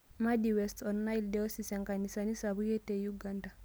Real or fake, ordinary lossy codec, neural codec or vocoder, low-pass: real; none; none; none